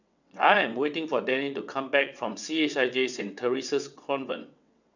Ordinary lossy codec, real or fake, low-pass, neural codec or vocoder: none; fake; 7.2 kHz; vocoder, 22.05 kHz, 80 mel bands, WaveNeXt